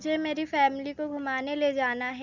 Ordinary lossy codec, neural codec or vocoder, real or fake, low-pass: none; none; real; 7.2 kHz